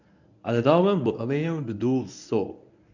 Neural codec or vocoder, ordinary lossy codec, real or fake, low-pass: codec, 24 kHz, 0.9 kbps, WavTokenizer, medium speech release version 1; none; fake; 7.2 kHz